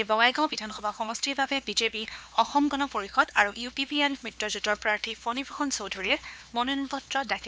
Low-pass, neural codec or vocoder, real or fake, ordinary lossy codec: none; codec, 16 kHz, 2 kbps, X-Codec, HuBERT features, trained on LibriSpeech; fake; none